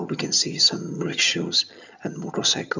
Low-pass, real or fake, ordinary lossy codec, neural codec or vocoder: 7.2 kHz; fake; none; vocoder, 22.05 kHz, 80 mel bands, HiFi-GAN